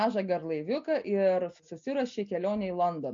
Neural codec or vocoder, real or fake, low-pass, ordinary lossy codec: none; real; 7.2 kHz; MP3, 48 kbps